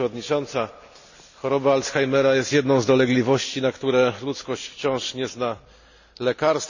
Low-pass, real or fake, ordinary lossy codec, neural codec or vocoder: 7.2 kHz; real; none; none